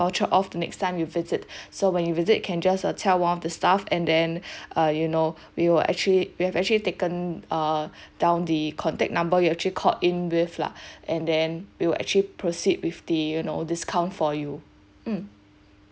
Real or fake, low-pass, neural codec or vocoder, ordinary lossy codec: real; none; none; none